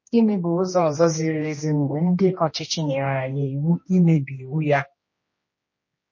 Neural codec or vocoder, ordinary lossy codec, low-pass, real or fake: codec, 16 kHz, 1 kbps, X-Codec, HuBERT features, trained on general audio; MP3, 32 kbps; 7.2 kHz; fake